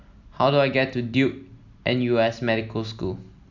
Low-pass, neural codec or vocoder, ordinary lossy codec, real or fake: 7.2 kHz; none; none; real